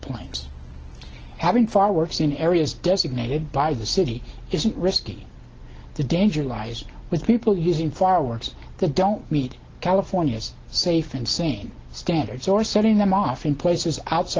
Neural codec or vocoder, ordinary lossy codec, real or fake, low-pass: none; Opus, 16 kbps; real; 7.2 kHz